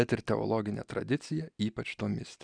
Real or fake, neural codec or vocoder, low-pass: real; none; 9.9 kHz